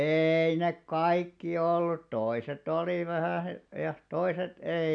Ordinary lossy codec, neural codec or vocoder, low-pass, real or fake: none; none; 9.9 kHz; real